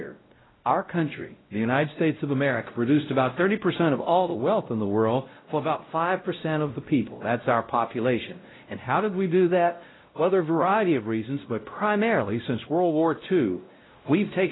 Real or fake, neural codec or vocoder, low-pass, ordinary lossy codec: fake; codec, 16 kHz, 0.5 kbps, X-Codec, WavLM features, trained on Multilingual LibriSpeech; 7.2 kHz; AAC, 16 kbps